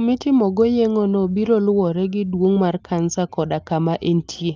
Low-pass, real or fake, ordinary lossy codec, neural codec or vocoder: 7.2 kHz; real; Opus, 32 kbps; none